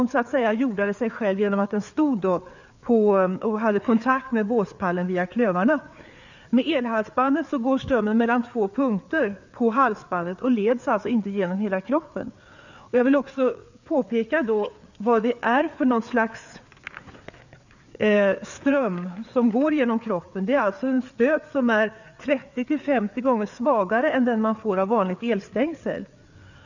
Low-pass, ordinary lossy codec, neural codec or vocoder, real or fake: 7.2 kHz; none; codec, 16 kHz, 4 kbps, FreqCodec, larger model; fake